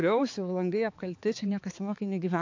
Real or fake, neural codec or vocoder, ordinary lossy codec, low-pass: fake; codec, 16 kHz, 4 kbps, X-Codec, HuBERT features, trained on balanced general audio; AAC, 48 kbps; 7.2 kHz